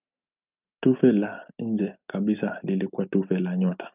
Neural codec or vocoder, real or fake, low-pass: none; real; 3.6 kHz